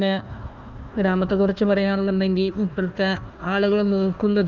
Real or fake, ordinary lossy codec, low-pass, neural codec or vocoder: fake; Opus, 32 kbps; 7.2 kHz; codec, 16 kHz, 1 kbps, FunCodec, trained on Chinese and English, 50 frames a second